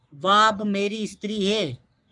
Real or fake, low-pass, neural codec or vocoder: fake; 10.8 kHz; codec, 44.1 kHz, 3.4 kbps, Pupu-Codec